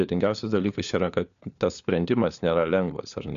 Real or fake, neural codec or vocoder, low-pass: fake; codec, 16 kHz, 4 kbps, FunCodec, trained on LibriTTS, 50 frames a second; 7.2 kHz